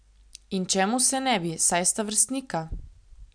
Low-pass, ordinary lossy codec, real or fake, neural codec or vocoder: 9.9 kHz; none; real; none